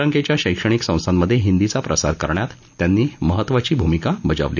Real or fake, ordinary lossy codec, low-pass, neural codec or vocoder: real; none; 7.2 kHz; none